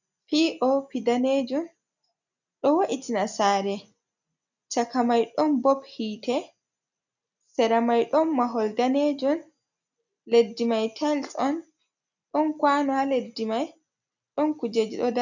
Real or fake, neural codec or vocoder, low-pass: real; none; 7.2 kHz